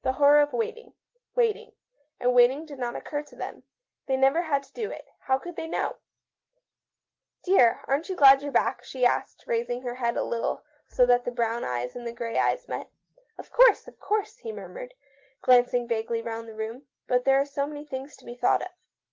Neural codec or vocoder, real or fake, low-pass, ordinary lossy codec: none; real; 7.2 kHz; Opus, 16 kbps